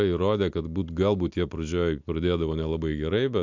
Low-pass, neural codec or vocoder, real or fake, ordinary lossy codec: 7.2 kHz; none; real; MP3, 64 kbps